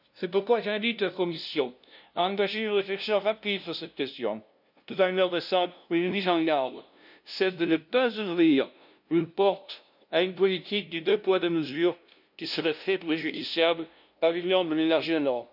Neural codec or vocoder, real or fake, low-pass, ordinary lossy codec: codec, 16 kHz, 0.5 kbps, FunCodec, trained on LibriTTS, 25 frames a second; fake; 5.4 kHz; none